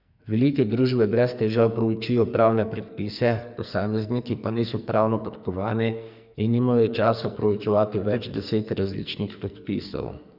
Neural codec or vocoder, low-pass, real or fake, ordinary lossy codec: codec, 44.1 kHz, 2.6 kbps, SNAC; 5.4 kHz; fake; none